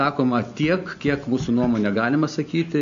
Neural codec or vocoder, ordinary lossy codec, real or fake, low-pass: none; AAC, 64 kbps; real; 7.2 kHz